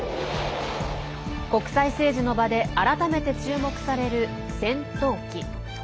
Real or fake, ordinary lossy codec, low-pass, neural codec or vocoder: real; none; none; none